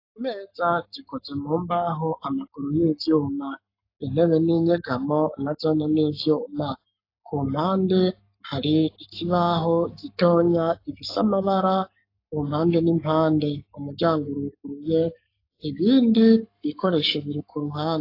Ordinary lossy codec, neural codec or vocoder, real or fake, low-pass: AAC, 32 kbps; codec, 44.1 kHz, 7.8 kbps, Pupu-Codec; fake; 5.4 kHz